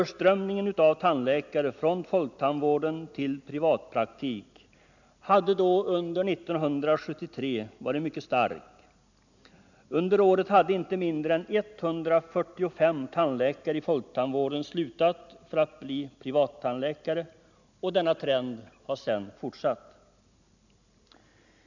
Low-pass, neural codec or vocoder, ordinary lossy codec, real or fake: 7.2 kHz; none; none; real